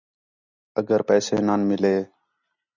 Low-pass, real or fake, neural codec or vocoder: 7.2 kHz; real; none